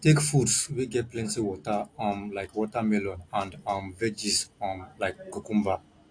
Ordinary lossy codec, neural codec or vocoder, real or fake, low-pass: AAC, 48 kbps; none; real; 9.9 kHz